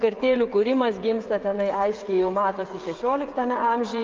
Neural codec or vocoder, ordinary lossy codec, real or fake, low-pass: codec, 16 kHz, 16 kbps, FreqCodec, smaller model; Opus, 24 kbps; fake; 7.2 kHz